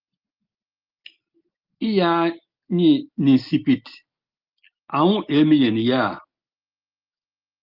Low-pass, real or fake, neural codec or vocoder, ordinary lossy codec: 5.4 kHz; real; none; Opus, 24 kbps